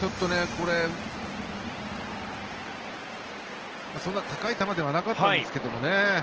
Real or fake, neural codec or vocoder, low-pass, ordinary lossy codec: real; none; 7.2 kHz; Opus, 16 kbps